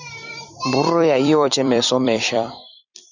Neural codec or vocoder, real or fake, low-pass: vocoder, 44.1 kHz, 128 mel bands every 256 samples, BigVGAN v2; fake; 7.2 kHz